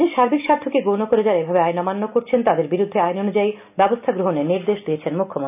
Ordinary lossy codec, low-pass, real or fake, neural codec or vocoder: none; 3.6 kHz; real; none